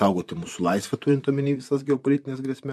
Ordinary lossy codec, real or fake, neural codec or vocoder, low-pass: MP3, 64 kbps; fake; vocoder, 44.1 kHz, 128 mel bands, Pupu-Vocoder; 14.4 kHz